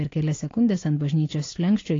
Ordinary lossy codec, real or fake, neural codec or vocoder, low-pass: AAC, 32 kbps; real; none; 7.2 kHz